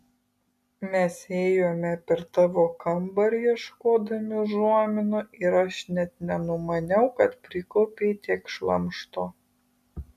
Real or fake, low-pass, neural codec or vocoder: real; 14.4 kHz; none